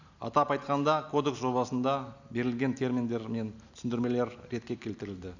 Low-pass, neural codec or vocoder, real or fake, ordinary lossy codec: 7.2 kHz; none; real; none